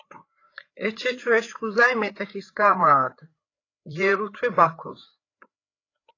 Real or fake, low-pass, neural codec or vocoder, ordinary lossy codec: fake; 7.2 kHz; codec, 16 kHz, 4 kbps, FreqCodec, larger model; AAC, 32 kbps